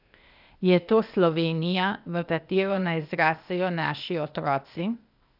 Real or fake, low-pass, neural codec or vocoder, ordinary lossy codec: fake; 5.4 kHz; codec, 16 kHz, 0.8 kbps, ZipCodec; none